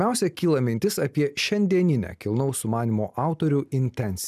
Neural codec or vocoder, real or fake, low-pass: none; real; 14.4 kHz